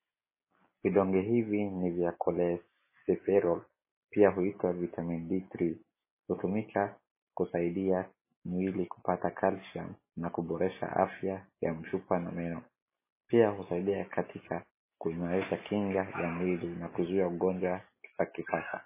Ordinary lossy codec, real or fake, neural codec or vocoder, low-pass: MP3, 16 kbps; real; none; 3.6 kHz